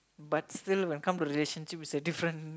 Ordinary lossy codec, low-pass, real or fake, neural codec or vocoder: none; none; real; none